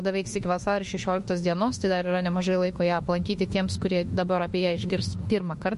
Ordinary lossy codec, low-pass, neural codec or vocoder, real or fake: MP3, 48 kbps; 14.4 kHz; autoencoder, 48 kHz, 32 numbers a frame, DAC-VAE, trained on Japanese speech; fake